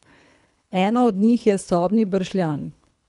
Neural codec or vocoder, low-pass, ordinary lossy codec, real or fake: codec, 24 kHz, 3 kbps, HILCodec; 10.8 kHz; none; fake